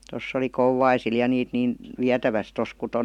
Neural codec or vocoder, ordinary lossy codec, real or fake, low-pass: none; MP3, 96 kbps; real; 19.8 kHz